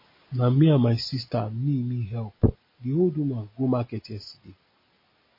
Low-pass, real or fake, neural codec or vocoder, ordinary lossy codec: 5.4 kHz; real; none; MP3, 24 kbps